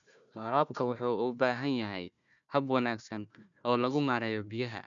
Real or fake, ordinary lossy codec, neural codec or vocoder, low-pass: fake; none; codec, 16 kHz, 1 kbps, FunCodec, trained on Chinese and English, 50 frames a second; 7.2 kHz